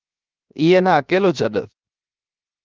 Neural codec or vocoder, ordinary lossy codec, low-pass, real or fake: codec, 16 kHz, 0.7 kbps, FocalCodec; Opus, 24 kbps; 7.2 kHz; fake